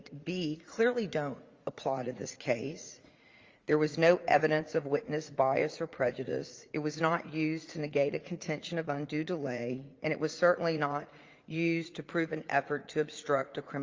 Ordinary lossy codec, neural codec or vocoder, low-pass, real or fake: Opus, 32 kbps; vocoder, 44.1 kHz, 80 mel bands, Vocos; 7.2 kHz; fake